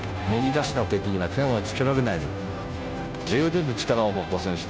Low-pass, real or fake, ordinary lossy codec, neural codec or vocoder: none; fake; none; codec, 16 kHz, 0.5 kbps, FunCodec, trained on Chinese and English, 25 frames a second